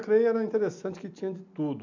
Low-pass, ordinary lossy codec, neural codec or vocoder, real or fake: 7.2 kHz; AAC, 48 kbps; none; real